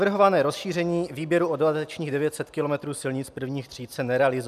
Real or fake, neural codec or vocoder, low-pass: real; none; 14.4 kHz